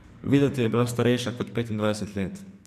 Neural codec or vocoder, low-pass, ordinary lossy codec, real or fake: codec, 44.1 kHz, 2.6 kbps, SNAC; 14.4 kHz; MP3, 96 kbps; fake